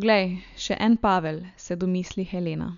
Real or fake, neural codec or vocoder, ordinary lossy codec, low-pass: real; none; none; 7.2 kHz